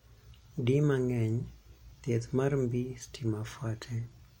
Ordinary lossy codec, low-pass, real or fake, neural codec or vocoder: MP3, 64 kbps; 19.8 kHz; real; none